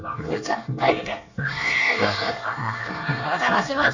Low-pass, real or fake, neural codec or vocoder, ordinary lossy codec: 7.2 kHz; fake; codec, 24 kHz, 1 kbps, SNAC; none